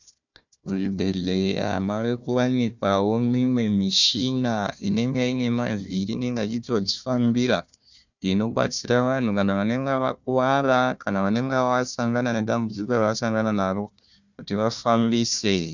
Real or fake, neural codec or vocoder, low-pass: fake; codec, 16 kHz, 1 kbps, FunCodec, trained on Chinese and English, 50 frames a second; 7.2 kHz